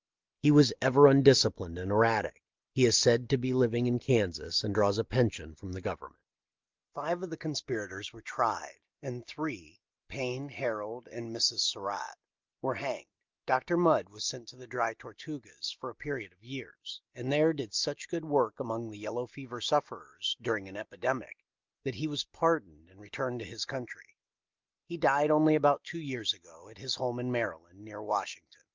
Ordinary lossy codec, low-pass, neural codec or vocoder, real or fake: Opus, 24 kbps; 7.2 kHz; none; real